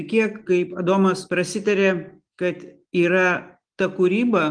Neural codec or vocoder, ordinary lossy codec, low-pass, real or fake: none; Opus, 24 kbps; 9.9 kHz; real